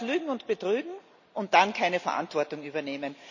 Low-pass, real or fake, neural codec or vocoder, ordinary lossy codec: 7.2 kHz; real; none; none